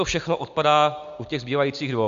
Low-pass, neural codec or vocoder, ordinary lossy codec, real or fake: 7.2 kHz; none; MP3, 64 kbps; real